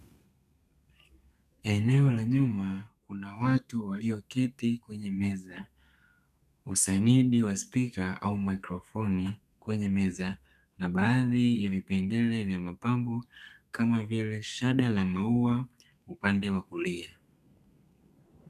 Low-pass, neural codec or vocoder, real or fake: 14.4 kHz; codec, 32 kHz, 1.9 kbps, SNAC; fake